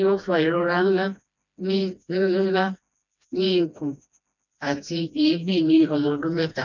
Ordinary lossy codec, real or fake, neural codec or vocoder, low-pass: none; fake; codec, 16 kHz, 1 kbps, FreqCodec, smaller model; 7.2 kHz